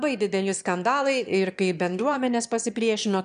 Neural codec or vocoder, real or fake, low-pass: autoencoder, 22.05 kHz, a latent of 192 numbers a frame, VITS, trained on one speaker; fake; 9.9 kHz